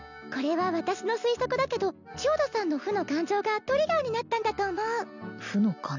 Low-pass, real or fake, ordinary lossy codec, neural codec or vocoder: 7.2 kHz; real; none; none